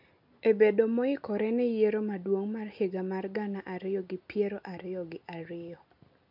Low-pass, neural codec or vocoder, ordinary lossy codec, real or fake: 5.4 kHz; none; MP3, 48 kbps; real